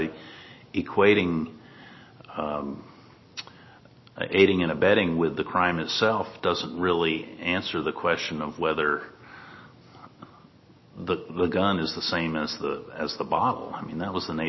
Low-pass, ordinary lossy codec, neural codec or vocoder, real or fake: 7.2 kHz; MP3, 24 kbps; none; real